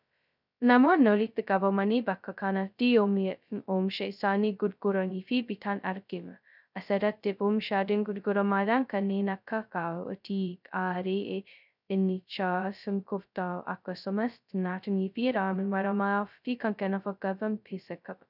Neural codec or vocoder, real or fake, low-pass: codec, 16 kHz, 0.2 kbps, FocalCodec; fake; 5.4 kHz